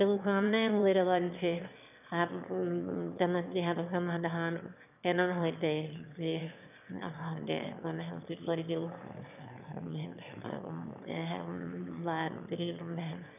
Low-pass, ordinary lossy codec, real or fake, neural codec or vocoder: 3.6 kHz; none; fake; autoencoder, 22.05 kHz, a latent of 192 numbers a frame, VITS, trained on one speaker